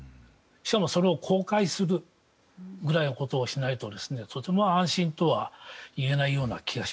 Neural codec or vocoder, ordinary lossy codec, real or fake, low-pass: none; none; real; none